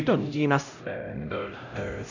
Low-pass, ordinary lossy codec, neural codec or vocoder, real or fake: 7.2 kHz; none; codec, 16 kHz, 0.5 kbps, X-Codec, HuBERT features, trained on LibriSpeech; fake